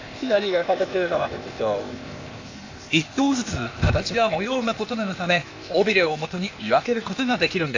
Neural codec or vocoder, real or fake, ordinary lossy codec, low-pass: codec, 16 kHz, 0.8 kbps, ZipCodec; fake; AAC, 48 kbps; 7.2 kHz